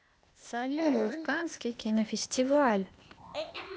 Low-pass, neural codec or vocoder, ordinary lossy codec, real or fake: none; codec, 16 kHz, 0.8 kbps, ZipCodec; none; fake